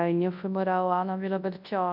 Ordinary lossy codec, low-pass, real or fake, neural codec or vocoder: none; 5.4 kHz; fake; codec, 24 kHz, 0.9 kbps, WavTokenizer, large speech release